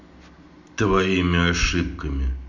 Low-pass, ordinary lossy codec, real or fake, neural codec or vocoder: 7.2 kHz; none; real; none